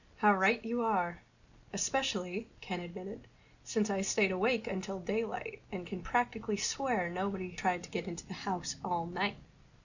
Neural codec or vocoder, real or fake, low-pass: none; real; 7.2 kHz